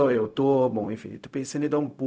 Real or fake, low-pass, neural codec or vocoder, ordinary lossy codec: fake; none; codec, 16 kHz, 0.4 kbps, LongCat-Audio-Codec; none